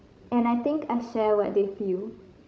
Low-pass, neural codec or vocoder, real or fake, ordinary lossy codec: none; codec, 16 kHz, 16 kbps, FreqCodec, larger model; fake; none